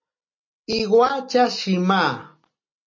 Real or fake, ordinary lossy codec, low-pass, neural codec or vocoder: real; MP3, 32 kbps; 7.2 kHz; none